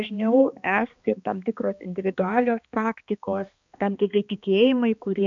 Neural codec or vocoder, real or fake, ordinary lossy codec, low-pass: codec, 16 kHz, 2 kbps, X-Codec, HuBERT features, trained on balanced general audio; fake; MP3, 64 kbps; 7.2 kHz